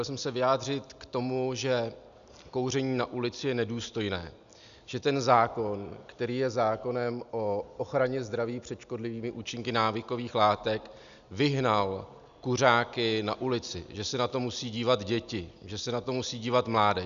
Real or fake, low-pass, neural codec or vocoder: real; 7.2 kHz; none